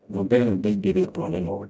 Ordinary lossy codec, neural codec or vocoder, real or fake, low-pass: none; codec, 16 kHz, 0.5 kbps, FreqCodec, smaller model; fake; none